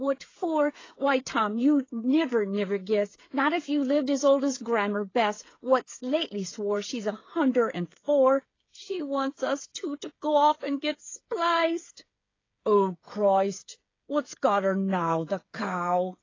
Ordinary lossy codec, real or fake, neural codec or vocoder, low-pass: AAC, 32 kbps; fake; codec, 16 kHz, 8 kbps, FreqCodec, smaller model; 7.2 kHz